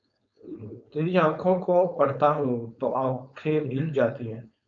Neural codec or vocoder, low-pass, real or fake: codec, 16 kHz, 4.8 kbps, FACodec; 7.2 kHz; fake